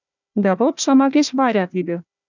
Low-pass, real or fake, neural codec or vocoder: 7.2 kHz; fake; codec, 16 kHz, 1 kbps, FunCodec, trained on Chinese and English, 50 frames a second